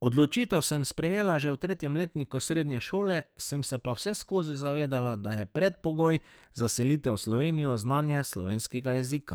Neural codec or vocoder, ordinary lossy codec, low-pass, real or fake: codec, 44.1 kHz, 2.6 kbps, SNAC; none; none; fake